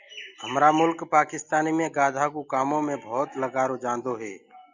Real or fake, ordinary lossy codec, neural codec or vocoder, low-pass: real; Opus, 64 kbps; none; 7.2 kHz